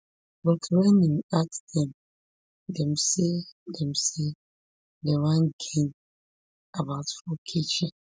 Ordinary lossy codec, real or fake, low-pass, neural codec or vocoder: none; real; none; none